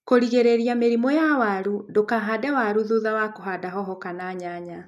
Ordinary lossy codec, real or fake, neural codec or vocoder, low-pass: none; real; none; 14.4 kHz